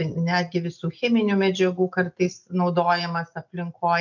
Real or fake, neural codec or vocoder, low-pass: real; none; 7.2 kHz